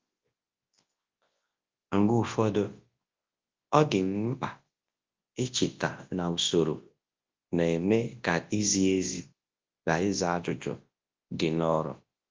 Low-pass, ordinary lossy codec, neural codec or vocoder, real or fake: 7.2 kHz; Opus, 32 kbps; codec, 24 kHz, 0.9 kbps, WavTokenizer, large speech release; fake